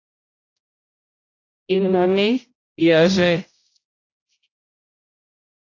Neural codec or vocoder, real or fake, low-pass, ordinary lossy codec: codec, 16 kHz, 0.5 kbps, X-Codec, HuBERT features, trained on general audio; fake; 7.2 kHz; AAC, 48 kbps